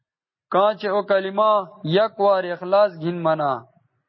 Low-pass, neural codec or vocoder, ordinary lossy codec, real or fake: 7.2 kHz; none; MP3, 24 kbps; real